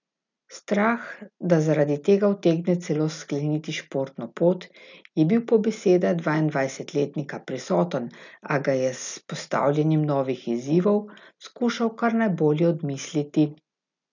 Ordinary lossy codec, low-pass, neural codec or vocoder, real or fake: none; 7.2 kHz; none; real